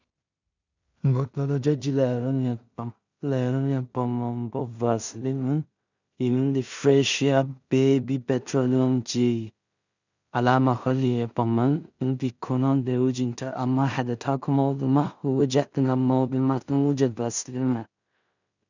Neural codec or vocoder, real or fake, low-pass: codec, 16 kHz in and 24 kHz out, 0.4 kbps, LongCat-Audio-Codec, two codebook decoder; fake; 7.2 kHz